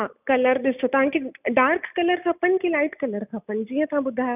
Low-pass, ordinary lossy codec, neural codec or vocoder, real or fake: 3.6 kHz; none; none; real